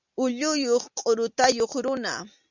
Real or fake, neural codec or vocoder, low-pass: real; none; 7.2 kHz